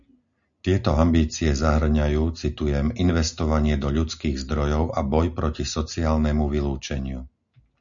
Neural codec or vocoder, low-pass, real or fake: none; 7.2 kHz; real